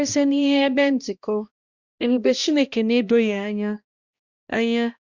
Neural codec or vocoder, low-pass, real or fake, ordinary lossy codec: codec, 16 kHz, 1 kbps, X-Codec, HuBERT features, trained on balanced general audio; 7.2 kHz; fake; Opus, 64 kbps